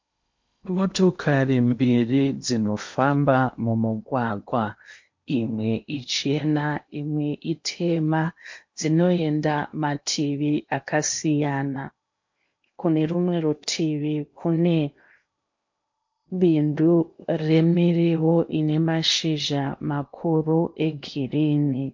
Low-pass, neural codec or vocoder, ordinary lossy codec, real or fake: 7.2 kHz; codec, 16 kHz in and 24 kHz out, 0.8 kbps, FocalCodec, streaming, 65536 codes; MP3, 48 kbps; fake